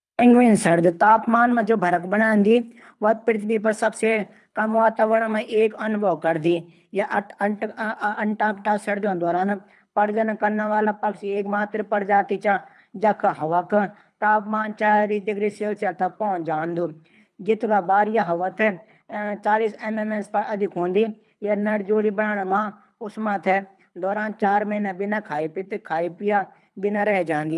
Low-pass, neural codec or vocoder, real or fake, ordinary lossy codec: none; codec, 24 kHz, 3 kbps, HILCodec; fake; none